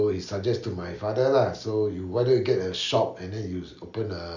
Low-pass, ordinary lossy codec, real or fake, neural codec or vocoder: 7.2 kHz; none; real; none